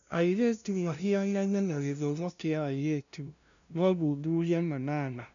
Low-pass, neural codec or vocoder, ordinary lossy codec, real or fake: 7.2 kHz; codec, 16 kHz, 0.5 kbps, FunCodec, trained on LibriTTS, 25 frames a second; none; fake